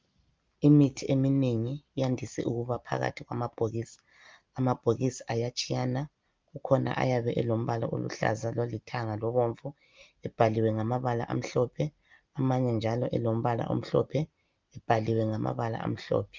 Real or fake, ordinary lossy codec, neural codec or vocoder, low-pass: real; Opus, 24 kbps; none; 7.2 kHz